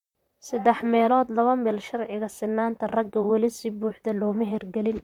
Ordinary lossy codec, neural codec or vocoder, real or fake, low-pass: MP3, 96 kbps; vocoder, 44.1 kHz, 128 mel bands, Pupu-Vocoder; fake; 19.8 kHz